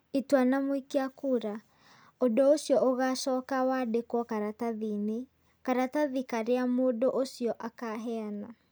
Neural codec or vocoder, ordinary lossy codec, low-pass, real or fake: none; none; none; real